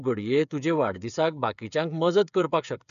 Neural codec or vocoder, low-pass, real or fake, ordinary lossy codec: codec, 16 kHz, 8 kbps, FreqCodec, smaller model; 7.2 kHz; fake; none